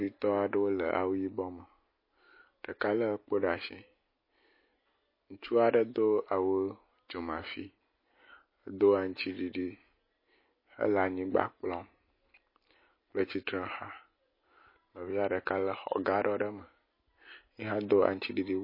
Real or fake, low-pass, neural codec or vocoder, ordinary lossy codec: real; 5.4 kHz; none; MP3, 24 kbps